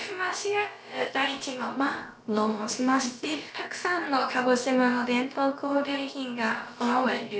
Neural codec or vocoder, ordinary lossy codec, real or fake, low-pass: codec, 16 kHz, about 1 kbps, DyCAST, with the encoder's durations; none; fake; none